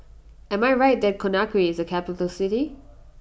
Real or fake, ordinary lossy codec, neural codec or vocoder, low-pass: real; none; none; none